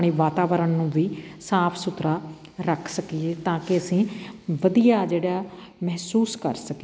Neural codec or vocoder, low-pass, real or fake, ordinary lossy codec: none; none; real; none